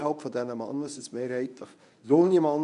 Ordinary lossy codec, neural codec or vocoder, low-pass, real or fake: none; codec, 24 kHz, 0.9 kbps, WavTokenizer, medium speech release version 1; 10.8 kHz; fake